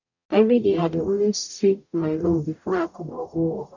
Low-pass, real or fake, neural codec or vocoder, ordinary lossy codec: 7.2 kHz; fake; codec, 44.1 kHz, 0.9 kbps, DAC; none